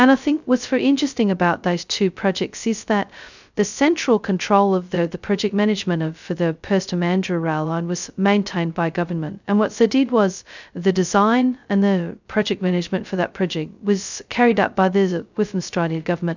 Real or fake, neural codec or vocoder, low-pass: fake; codec, 16 kHz, 0.2 kbps, FocalCodec; 7.2 kHz